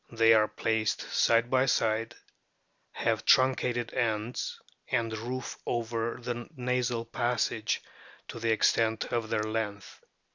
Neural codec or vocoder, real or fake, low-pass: none; real; 7.2 kHz